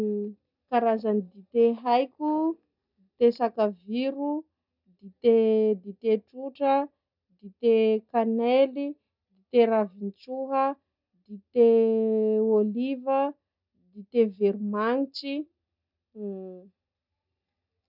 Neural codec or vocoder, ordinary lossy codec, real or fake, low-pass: none; none; real; 5.4 kHz